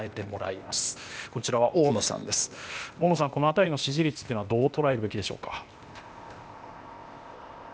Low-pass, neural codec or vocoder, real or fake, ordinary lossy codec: none; codec, 16 kHz, 0.8 kbps, ZipCodec; fake; none